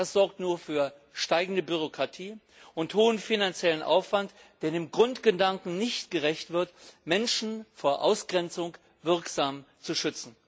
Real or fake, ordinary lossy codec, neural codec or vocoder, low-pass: real; none; none; none